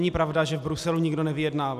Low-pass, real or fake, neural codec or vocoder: 14.4 kHz; real; none